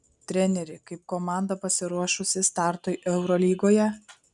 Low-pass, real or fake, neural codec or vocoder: 10.8 kHz; real; none